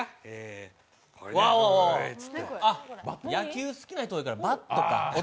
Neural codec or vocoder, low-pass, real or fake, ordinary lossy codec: none; none; real; none